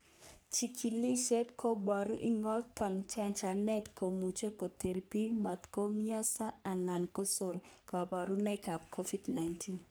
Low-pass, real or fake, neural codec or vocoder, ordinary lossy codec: none; fake; codec, 44.1 kHz, 3.4 kbps, Pupu-Codec; none